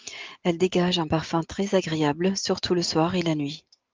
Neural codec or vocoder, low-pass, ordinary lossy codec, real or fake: none; 7.2 kHz; Opus, 24 kbps; real